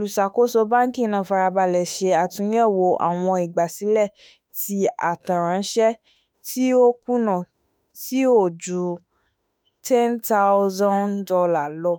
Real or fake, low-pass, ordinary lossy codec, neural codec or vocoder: fake; none; none; autoencoder, 48 kHz, 32 numbers a frame, DAC-VAE, trained on Japanese speech